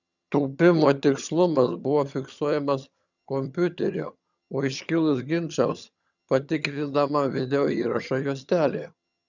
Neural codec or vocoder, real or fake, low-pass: vocoder, 22.05 kHz, 80 mel bands, HiFi-GAN; fake; 7.2 kHz